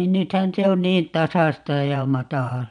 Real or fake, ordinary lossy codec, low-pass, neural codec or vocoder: fake; none; 9.9 kHz; vocoder, 22.05 kHz, 80 mel bands, Vocos